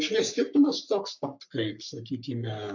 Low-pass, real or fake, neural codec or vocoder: 7.2 kHz; fake; codec, 44.1 kHz, 3.4 kbps, Pupu-Codec